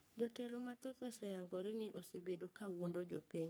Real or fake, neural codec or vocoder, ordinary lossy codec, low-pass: fake; codec, 44.1 kHz, 3.4 kbps, Pupu-Codec; none; none